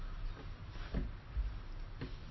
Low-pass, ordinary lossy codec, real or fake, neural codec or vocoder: 7.2 kHz; MP3, 24 kbps; real; none